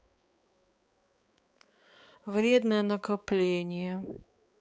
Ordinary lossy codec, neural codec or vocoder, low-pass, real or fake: none; codec, 16 kHz, 4 kbps, X-Codec, HuBERT features, trained on balanced general audio; none; fake